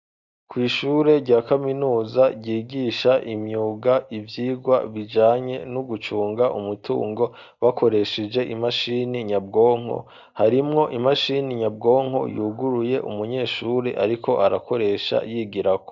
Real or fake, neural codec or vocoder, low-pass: real; none; 7.2 kHz